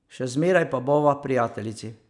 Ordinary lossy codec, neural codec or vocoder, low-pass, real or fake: none; none; 10.8 kHz; real